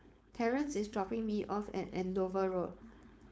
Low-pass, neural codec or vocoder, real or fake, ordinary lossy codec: none; codec, 16 kHz, 4.8 kbps, FACodec; fake; none